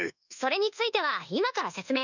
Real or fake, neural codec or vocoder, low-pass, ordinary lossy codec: fake; codec, 24 kHz, 1.2 kbps, DualCodec; 7.2 kHz; none